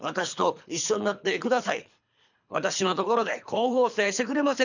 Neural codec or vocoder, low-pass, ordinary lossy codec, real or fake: codec, 24 kHz, 3 kbps, HILCodec; 7.2 kHz; none; fake